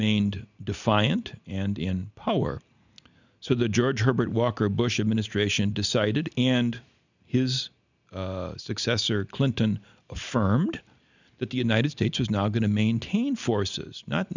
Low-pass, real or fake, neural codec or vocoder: 7.2 kHz; real; none